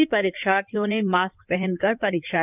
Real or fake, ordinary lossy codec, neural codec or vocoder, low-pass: fake; none; codec, 16 kHz in and 24 kHz out, 2.2 kbps, FireRedTTS-2 codec; 3.6 kHz